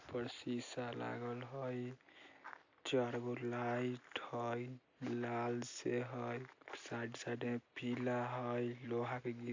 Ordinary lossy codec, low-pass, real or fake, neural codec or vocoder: AAC, 48 kbps; 7.2 kHz; real; none